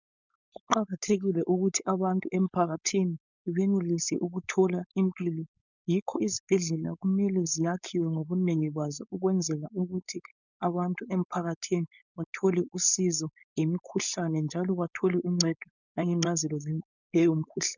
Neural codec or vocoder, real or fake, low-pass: codec, 16 kHz, 4.8 kbps, FACodec; fake; 7.2 kHz